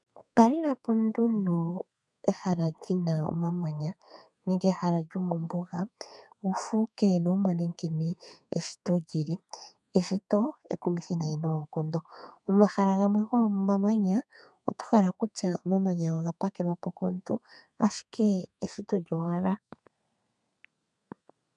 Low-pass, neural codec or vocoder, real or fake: 10.8 kHz; codec, 32 kHz, 1.9 kbps, SNAC; fake